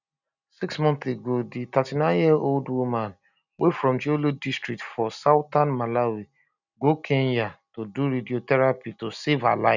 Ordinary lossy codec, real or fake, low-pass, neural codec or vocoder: none; real; 7.2 kHz; none